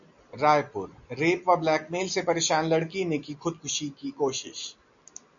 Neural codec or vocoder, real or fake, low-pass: none; real; 7.2 kHz